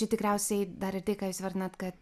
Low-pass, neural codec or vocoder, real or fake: 14.4 kHz; none; real